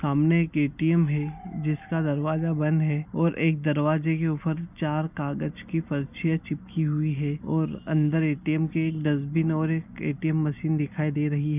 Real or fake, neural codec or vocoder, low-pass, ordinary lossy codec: real; none; 3.6 kHz; none